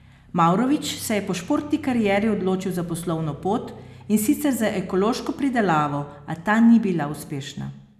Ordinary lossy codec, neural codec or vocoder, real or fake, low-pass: none; none; real; 14.4 kHz